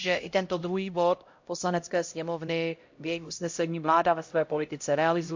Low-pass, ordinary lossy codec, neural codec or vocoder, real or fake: 7.2 kHz; MP3, 48 kbps; codec, 16 kHz, 0.5 kbps, X-Codec, HuBERT features, trained on LibriSpeech; fake